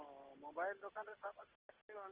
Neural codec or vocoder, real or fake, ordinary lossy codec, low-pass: none; real; Opus, 16 kbps; 3.6 kHz